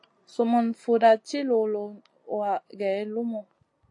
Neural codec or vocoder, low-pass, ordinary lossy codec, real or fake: none; 10.8 kHz; AAC, 48 kbps; real